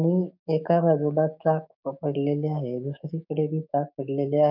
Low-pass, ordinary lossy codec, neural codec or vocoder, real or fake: 5.4 kHz; none; codec, 16 kHz, 6 kbps, DAC; fake